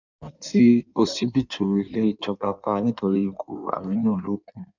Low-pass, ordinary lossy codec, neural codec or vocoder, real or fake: 7.2 kHz; none; codec, 16 kHz in and 24 kHz out, 1.1 kbps, FireRedTTS-2 codec; fake